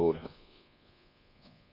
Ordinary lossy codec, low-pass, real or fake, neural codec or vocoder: none; 5.4 kHz; fake; codec, 24 kHz, 1.2 kbps, DualCodec